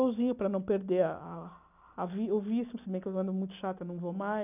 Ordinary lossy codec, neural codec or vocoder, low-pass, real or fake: none; none; 3.6 kHz; real